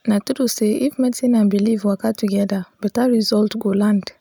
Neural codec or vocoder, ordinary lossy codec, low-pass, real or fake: none; none; none; real